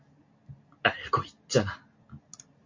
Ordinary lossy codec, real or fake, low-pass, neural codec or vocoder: MP3, 48 kbps; real; 7.2 kHz; none